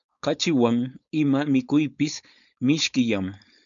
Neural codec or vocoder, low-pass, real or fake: codec, 16 kHz, 4.8 kbps, FACodec; 7.2 kHz; fake